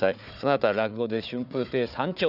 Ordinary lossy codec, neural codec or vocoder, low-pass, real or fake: none; codec, 16 kHz, 4 kbps, FunCodec, trained on Chinese and English, 50 frames a second; 5.4 kHz; fake